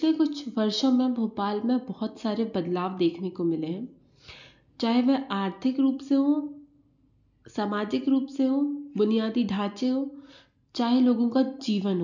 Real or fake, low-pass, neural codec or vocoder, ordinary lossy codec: real; 7.2 kHz; none; none